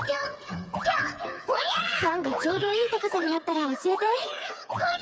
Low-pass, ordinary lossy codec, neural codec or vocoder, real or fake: none; none; codec, 16 kHz, 4 kbps, FreqCodec, smaller model; fake